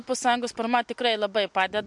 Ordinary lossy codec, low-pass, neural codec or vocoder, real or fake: MP3, 64 kbps; 10.8 kHz; none; real